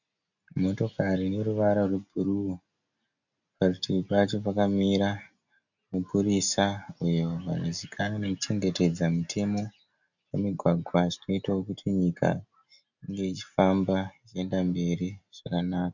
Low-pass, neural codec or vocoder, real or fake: 7.2 kHz; none; real